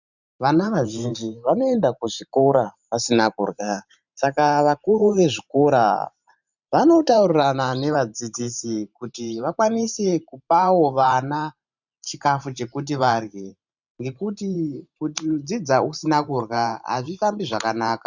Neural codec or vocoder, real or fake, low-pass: vocoder, 44.1 kHz, 128 mel bands every 512 samples, BigVGAN v2; fake; 7.2 kHz